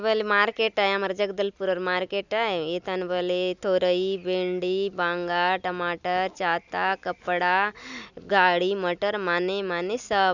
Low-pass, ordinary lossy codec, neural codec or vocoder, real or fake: 7.2 kHz; none; none; real